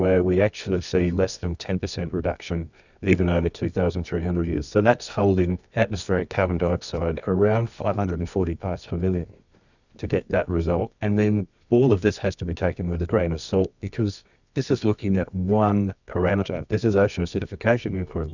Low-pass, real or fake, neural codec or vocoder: 7.2 kHz; fake; codec, 24 kHz, 0.9 kbps, WavTokenizer, medium music audio release